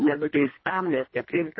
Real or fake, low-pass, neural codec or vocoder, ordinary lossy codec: fake; 7.2 kHz; codec, 24 kHz, 1.5 kbps, HILCodec; MP3, 24 kbps